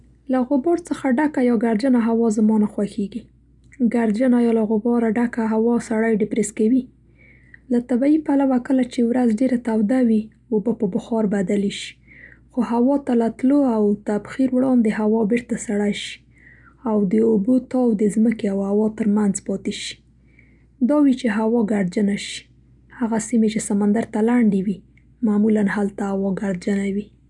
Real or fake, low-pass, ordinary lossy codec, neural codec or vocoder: real; 10.8 kHz; none; none